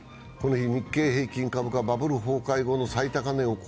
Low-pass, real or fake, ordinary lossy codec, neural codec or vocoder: none; real; none; none